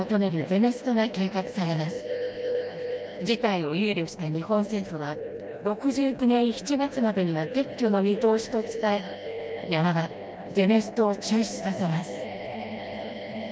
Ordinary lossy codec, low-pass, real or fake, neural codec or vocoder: none; none; fake; codec, 16 kHz, 1 kbps, FreqCodec, smaller model